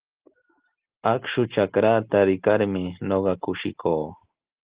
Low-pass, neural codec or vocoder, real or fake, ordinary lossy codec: 3.6 kHz; none; real; Opus, 16 kbps